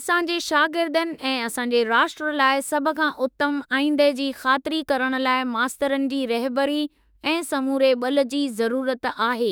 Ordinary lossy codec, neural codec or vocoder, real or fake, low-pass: none; autoencoder, 48 kHz, 32 numbers a frame, DAC-VAE, trained on Japanese speech; fake; none